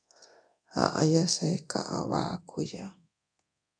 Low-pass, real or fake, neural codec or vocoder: 9.9 kHz; fake; codec, 24 kHz, 0.9 kbps, DualCodec